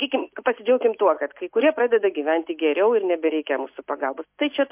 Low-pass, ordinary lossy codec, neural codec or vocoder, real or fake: 3.6 kHz; MP3, 32 kbps; none; real